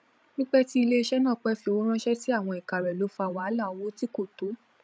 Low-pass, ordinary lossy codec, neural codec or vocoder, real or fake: none; none; codec, 16 kHz, 16 kbps, FreqCodec, larger model; fake